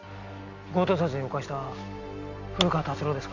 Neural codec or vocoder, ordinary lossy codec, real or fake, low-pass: none; none; real; 7.2 kHz